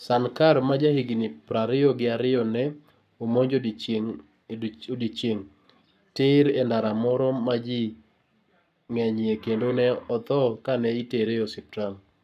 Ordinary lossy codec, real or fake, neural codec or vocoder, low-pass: none; fake; codec, 44.1 kHz, 7.8 kbps, Pupu-Codec; 14.4 kHz